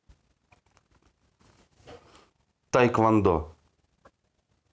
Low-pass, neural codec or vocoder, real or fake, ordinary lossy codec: none; none; real; none